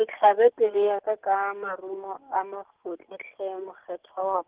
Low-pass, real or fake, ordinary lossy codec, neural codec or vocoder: 3.6 kHz; real; Opus, 16 kbps; none